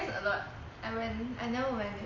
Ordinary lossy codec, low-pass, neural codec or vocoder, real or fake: MP3, 32 kbps; 7.2 kHz; none; real